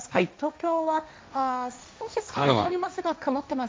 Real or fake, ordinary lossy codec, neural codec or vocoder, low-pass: fake; none; codec, 16 kHz, 1.1 kbps, Voila-Tokenizer; none